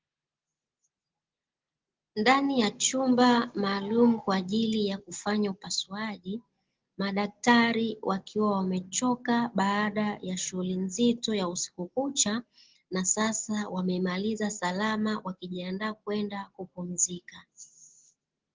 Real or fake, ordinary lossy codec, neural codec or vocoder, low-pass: real; Opus, 16 kbps; none; 7.2 kHz